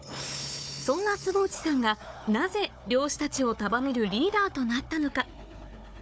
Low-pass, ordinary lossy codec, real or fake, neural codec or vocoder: none; none; fake; codec, 16 kHz, 4 kbps, FunCodec, trained on Chinese and English, 50 frames a second